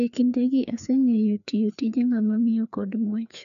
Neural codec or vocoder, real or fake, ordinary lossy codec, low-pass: codec, 16 kHz, 2 kbps, FreqCodec, larger model; fake; none; 7.2 kHz